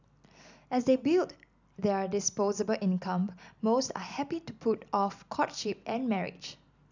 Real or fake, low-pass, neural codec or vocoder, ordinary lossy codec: real; 7.2 kHz; none; none